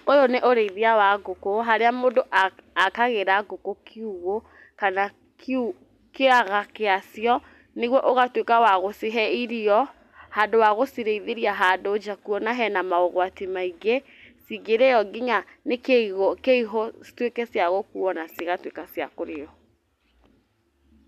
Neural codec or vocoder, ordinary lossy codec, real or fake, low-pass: none; none; real; 14.4 kHz